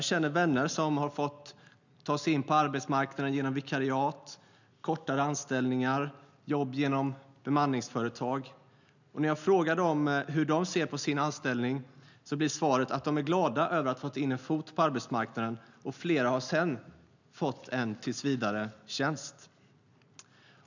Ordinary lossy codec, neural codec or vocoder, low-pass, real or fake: none; none; 7.2 kHz; real